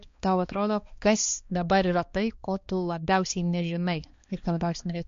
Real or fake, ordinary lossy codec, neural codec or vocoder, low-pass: fake; MP3, 48 kbps; codec, 16 kHz, 2 kbps, X-Codec, HuBERT features, trained on balanced general audio; 7.2 kHz